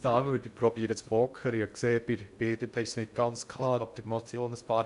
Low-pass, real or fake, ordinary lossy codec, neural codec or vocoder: 10.8 kHz; fake; AAC, 96 kbps; codec, 16 kHz in and 24 kHz out, 0.6 kbps, FocalCodec, streaming, 2048 codes